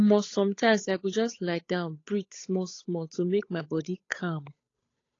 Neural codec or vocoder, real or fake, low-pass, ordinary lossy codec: codec, 16 kHz, 8 kbps, FunCodec, trained on Chinese and English, 25 frames a second; fake; 7.2 kHz; AAC, 32 kbps